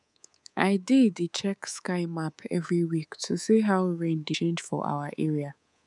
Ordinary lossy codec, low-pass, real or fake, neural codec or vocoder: none; 10.8 kHz; fake; codec, 24 kHz, 3.1 kbps, DualCodec